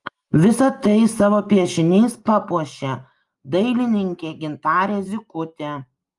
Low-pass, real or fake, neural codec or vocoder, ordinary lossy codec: 10.8 kHz; real; none; Opus, 32 kbps